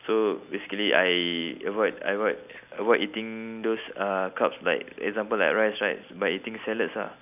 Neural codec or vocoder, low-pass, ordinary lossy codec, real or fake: none; 3.6 kHz; none; real